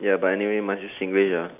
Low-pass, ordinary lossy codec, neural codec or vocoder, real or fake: 3.6 kHz; none; none; real